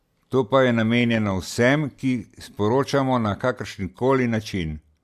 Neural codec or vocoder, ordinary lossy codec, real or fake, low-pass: none; Opus, 64 kbps; real; 14.4 kHz